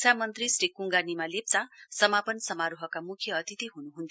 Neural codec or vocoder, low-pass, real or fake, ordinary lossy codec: none; none; real; none